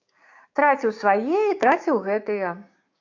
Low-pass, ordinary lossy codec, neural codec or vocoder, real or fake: 7.2 kHz; AAC, 48 kbps; codec, 16 kHz, 6 kbps, DAC; fake